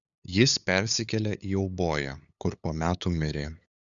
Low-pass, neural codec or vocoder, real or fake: 7.2 kHz; codec, 16 kHz, 8 kbps, FunCodec, trained on LibriTTS, 25 frames a second; fake